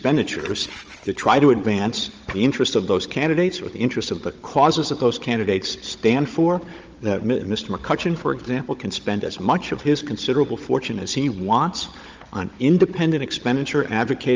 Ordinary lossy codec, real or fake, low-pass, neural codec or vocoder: Opus, 24 kbps; fake; 7.2 kHz; codec, 16 kHz, 16 kbps, FunCodec, trained on Chinese and English, 50 frames a second